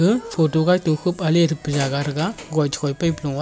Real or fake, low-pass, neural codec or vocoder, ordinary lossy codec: real; none; none; none